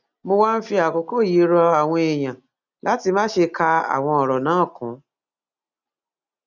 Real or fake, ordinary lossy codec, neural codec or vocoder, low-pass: real; none; none; 7.2 kHz